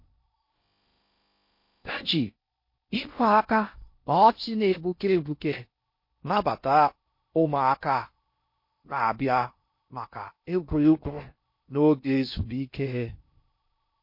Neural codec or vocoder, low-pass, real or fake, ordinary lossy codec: codec, 16 kHz in and 24 kHz out, 0.6 kbps, FocalCodec, streaming, 4096 codes; 5.4 kHz; fake; MP3, 32 kbps